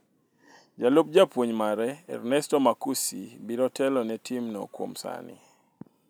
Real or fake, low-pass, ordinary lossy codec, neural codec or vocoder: real; none; none; none